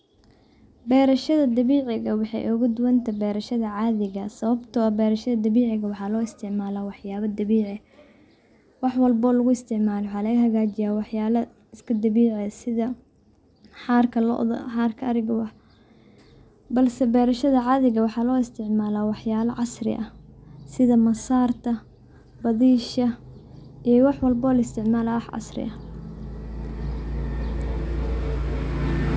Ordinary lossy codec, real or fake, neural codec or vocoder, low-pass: none; real; none; none